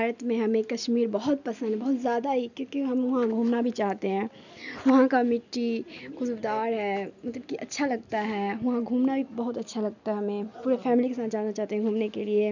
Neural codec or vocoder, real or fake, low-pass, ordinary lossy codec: none; real; 7.2 kHz; none